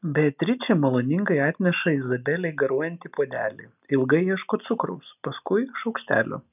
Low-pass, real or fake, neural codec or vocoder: 3.6 kHz; real; none